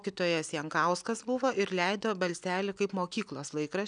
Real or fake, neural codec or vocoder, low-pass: real; none; 9.9 kHz